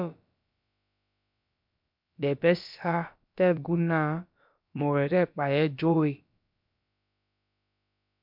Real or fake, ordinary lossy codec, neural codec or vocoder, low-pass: fake; MP3, 48 kbps; codec, 16 kHz, about 1 kbps, DyCAST, with the encoder's durations; 5.4 kHz